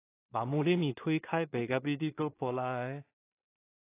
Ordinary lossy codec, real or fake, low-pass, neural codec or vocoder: AAC, 24 kbps; fake; 3.6 kHz; codec, 16 kHz in and 24 kHz out, 0.4 kbps, LongCat-Audio-Codec, two codebook decoder